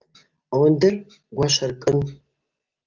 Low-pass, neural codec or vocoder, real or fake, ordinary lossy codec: 7.2 kHz; none; real; Opus, 24 kbps